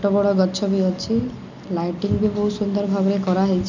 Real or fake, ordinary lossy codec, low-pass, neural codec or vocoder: real; none; 7.2 kHz; none